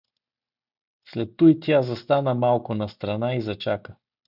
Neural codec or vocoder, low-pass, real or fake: none; 5.4 kHz; real